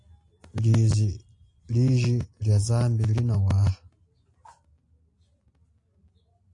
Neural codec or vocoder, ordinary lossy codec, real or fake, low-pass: none; AAC, 48 kbps; real; 10.8 kHz